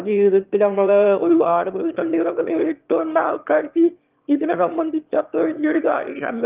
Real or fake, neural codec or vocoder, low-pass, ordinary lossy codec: fake; autoencoder, 22.05 kHz, a latent of 192 numbers a frame, VITS, trained on one speaker; 3.6 kHz; Opus, 32 kbps